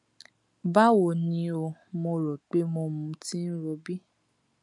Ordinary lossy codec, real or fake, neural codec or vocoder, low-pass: none; real; none; 10.8 kHz